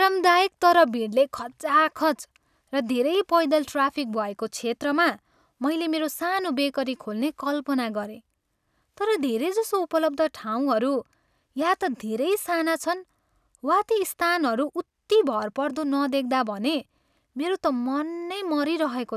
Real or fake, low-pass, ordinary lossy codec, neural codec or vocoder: real; 14.4 kHz; none; none